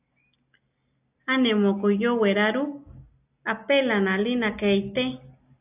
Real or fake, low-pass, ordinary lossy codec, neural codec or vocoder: real; 3.6 kHz; AAC, 32 kbps; none